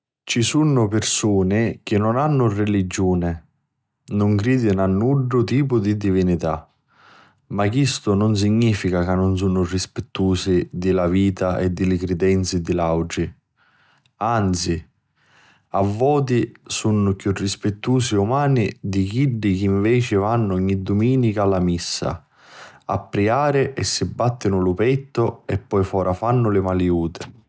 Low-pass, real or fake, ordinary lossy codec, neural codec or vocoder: none; real; none; none